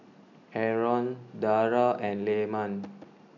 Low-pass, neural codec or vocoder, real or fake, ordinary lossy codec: 7.2 kHz; none; real; none